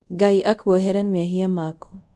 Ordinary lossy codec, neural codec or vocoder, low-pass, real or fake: none; codec, 24 kHz, 0.5 kbps, DualCodec; 10.8 kHz; fake